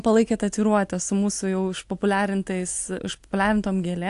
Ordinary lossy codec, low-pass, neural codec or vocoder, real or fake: AAC, 64 kbps; 10.8 kHz; none; real